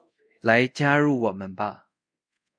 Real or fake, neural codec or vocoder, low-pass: fake; codec, 24 kHz, 0.5 kbps, DualCodec; 9.9 kHz